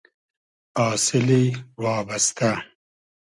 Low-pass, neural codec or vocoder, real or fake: 10.8 kHz; none; real